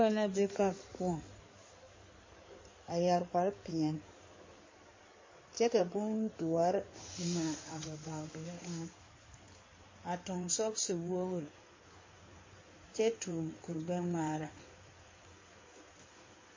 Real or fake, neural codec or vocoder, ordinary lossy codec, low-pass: fake; codec, 16 kHz in and 24 kHz out, 2.2 kbps, FireRedTTS-2 codec; MP3, 32 kbps; 7.2 kHz